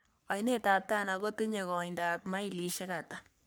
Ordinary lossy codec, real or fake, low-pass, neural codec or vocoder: none; fake; none; codec, 44.1 kHz, 3.4 kbps, Pupu-Codec